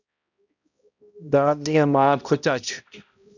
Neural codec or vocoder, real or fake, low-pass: codec, 16 kHz, 0.5 kbps, X-Codec, HuBERT features, trained on balanced general audio; fake; 7.2 kHz